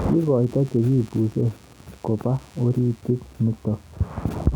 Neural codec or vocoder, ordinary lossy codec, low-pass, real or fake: none; none; 19.8 kHz; real